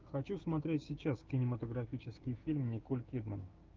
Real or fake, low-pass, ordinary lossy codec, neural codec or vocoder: fake; 7.2 kHz; Opus, 24 kbps; codec, 16 kHz, 6 kbps, DAC